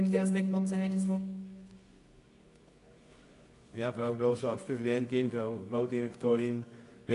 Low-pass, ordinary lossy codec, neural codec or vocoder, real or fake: 10.8 kHz; AAC, 48 kbps; codec, 24 kHz, 0.9 kbps, WavTokenizer, medium music audio release; fake